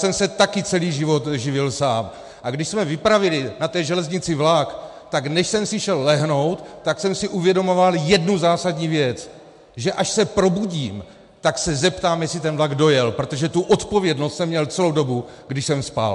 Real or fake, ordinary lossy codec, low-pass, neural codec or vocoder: real; MP3, 64 kbps; 10.8 kHz; none